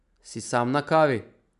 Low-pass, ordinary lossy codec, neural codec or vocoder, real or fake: 10.8 kHz; none; none; real